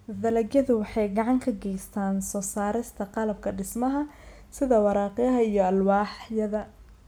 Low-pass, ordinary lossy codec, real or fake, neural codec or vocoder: none; none; real; none